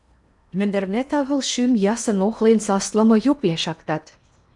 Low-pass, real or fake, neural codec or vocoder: 10.8 kHz; fake; codec, 16 kHz in and 24 kHz out, 0.8 kbps, FocalCodec, streaming, 65536 codes